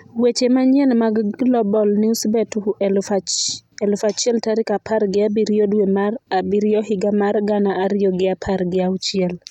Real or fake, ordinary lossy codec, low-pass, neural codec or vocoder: real; none; 19.8 kHz; none